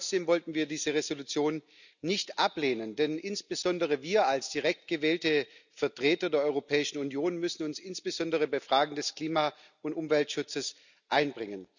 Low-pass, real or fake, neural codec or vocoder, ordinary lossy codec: 7.2 kHz; real; none; none